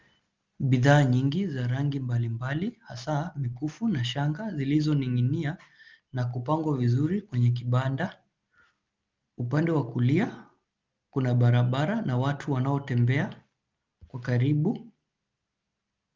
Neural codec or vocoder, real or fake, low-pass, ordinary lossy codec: none; real; 7.2 kHz; Opus, 32 kbps